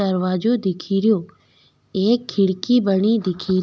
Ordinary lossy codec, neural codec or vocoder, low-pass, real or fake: none; none; none; real